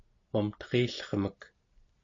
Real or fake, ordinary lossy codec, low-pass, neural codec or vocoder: real; MP3, 48 kbps; 7.2 kHz; none